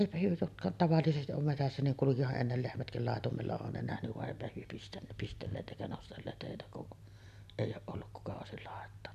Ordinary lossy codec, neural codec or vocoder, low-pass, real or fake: none; none; 14.4 kHz; real